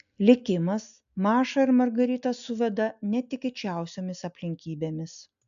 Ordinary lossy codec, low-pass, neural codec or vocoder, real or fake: AAC, 96 kbps; 7.2 kHz; none; real